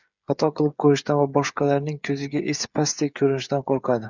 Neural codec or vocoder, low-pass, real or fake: codec, 16 kHz, 8 kbps, FreqCodec, smaller model; 7.2 kHz; fake